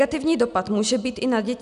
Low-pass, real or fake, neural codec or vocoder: 10.8 kHz; real; none